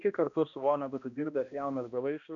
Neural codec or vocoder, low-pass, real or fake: codec, 16 kHz, 1 kbps, X-Codec, HuBERT features, trained on balanced general audio; 7.2 kHz; fake